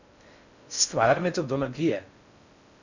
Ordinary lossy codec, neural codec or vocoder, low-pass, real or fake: none; codec, 16 kHz in and 24 kHz out, 0.6 kbps, FocalCodec, streaming, 4096 codes; 7.2 kHz; fake